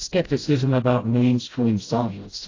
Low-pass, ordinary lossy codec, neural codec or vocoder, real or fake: 7.2 kHz; AAC, 32 kbps; codec, 16 kHz, 0.5 kbps, FreqCodec, smaller model; fake